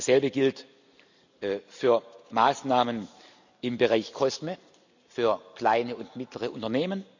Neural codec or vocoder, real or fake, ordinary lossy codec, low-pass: none; real; none; 7.2 kHz